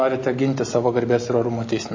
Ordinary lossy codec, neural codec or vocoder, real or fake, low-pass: MP3, 32 kbps; none; real; 7.2 kHz